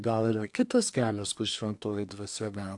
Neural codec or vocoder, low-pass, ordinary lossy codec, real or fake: codec, 24 kHz, 1 kbps, SNAC; 10.8 kHz; AAC, 64 kbps; fake